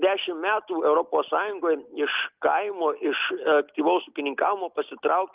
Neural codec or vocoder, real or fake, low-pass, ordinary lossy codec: none; real; 3.6 kHz; Opus, 24 kbps